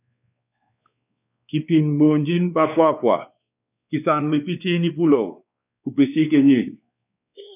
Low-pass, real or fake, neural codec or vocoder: 3.6 kHz; fake; codec, 16 kHz, 2 kbps, X-Codec, WavLM features, trained on Multilingual LibriSpeech